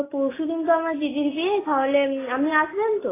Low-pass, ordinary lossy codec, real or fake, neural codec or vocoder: 3.6 kHz; AAC, 16 kbps; real; none